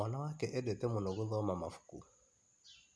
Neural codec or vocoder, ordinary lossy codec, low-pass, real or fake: none; none; none; real